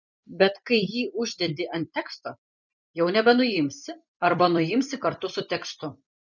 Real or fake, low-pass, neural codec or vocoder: fake; 7.2 kHz; vocoder, 44.1 kHz, 128 mel bands every 256 samples, BigVGAN v2